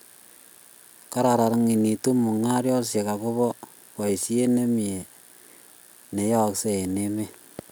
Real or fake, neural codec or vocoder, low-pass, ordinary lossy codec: real; none; none; none